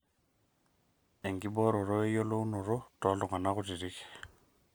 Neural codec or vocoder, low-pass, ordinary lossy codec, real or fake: none; none; none; real